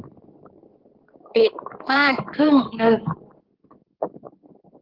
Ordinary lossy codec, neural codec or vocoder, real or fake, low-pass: Opus, 16 kbps; codec, 16 kHz in and 24 kHz out, 2.2 kbps, FireRedTTS-2 codec; fake; 5.4 kHz